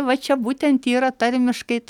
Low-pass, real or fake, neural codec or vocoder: 19.8 kHz; real; none